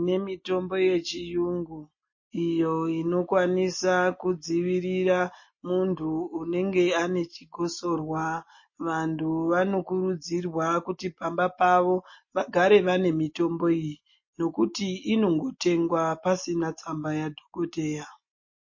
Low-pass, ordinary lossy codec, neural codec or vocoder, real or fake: 7.2 kHz; MP3, 32 kbps; none; real